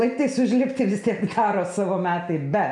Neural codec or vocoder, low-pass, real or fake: none; 10.8 kHz; real